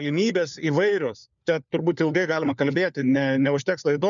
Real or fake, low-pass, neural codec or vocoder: fake; 7.2 kHz; codec, 16 kHz, 4 kbps, FunCodec, trained on LibriTTS, 50 frames a second